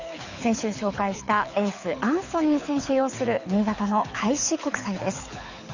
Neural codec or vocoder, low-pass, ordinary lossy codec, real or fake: codec, 24 kHz, 6 kbps, HILCodec; 7.2 kHz; Opus, 64 kbps; fake